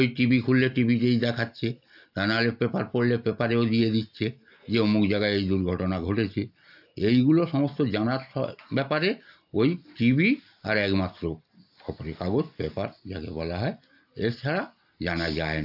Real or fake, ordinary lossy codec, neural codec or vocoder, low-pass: real; AAC, 48 kbps; none; 5.4 kHz